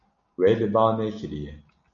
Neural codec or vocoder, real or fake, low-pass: none; real; 7.2 kHz